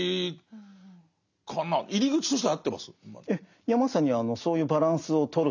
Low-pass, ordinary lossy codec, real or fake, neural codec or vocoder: 7.2 kHz; none; real; none